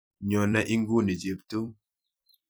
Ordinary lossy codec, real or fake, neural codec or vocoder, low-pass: none; fake; vocoder, 44.1 kHz, 128 mel bands every 256 samples, BigVGAN v2; none